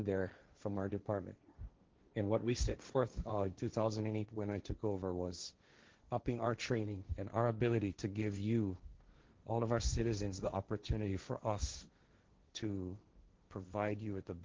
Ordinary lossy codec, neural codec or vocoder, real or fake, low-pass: Opus, 16 kbps; codec, 16 kHz, 1.1 kbps, Voila-Tokenizer; fake; 7.2 kHz